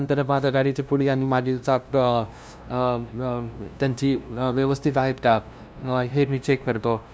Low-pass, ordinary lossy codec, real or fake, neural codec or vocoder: none; none; fake; codec, 16 kHz, 0.5 kbps, FunCodec, trained on LibriTTS, 25 frames a second